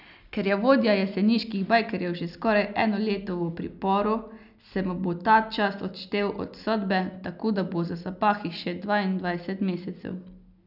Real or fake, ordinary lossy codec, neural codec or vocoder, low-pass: real; none; none; 5.4 kHz